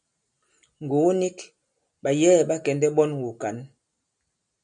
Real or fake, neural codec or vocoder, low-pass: real; none; 9.9 kHz